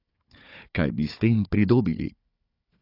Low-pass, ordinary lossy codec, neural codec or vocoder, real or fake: 5.4 kHz; AAC, 32 kbps; codec, 16 kHz, 4 kbps, FunCodec, trained on Chinese and English, 50 frames a second; fake